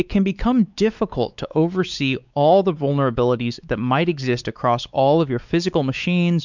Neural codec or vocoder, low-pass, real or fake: codec, 16 kHz, 4 kbps, X-Codec, WavLM features, trained on Multilingual LibriSpeech; 7.2 kHz; fake